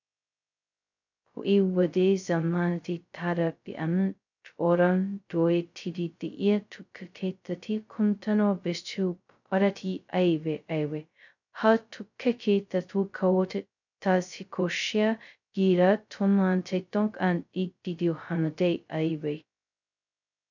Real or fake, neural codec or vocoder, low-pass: fake; codec, 16 kHz, 0.2 kbps, FocalCodec; 7.2 kHz